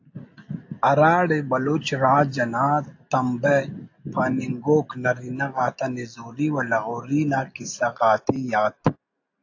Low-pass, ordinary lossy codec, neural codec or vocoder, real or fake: 7.2 kHz; AAC, 48 kbps; none; real